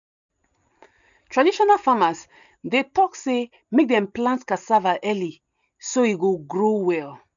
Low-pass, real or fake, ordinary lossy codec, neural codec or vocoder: 7.2 kHz; real; none; none